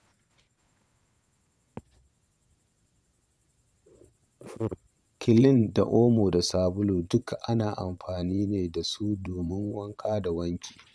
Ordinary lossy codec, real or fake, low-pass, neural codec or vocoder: none; fake; none; vocoder, 22.05 kHz, 80 mel bands, Vocos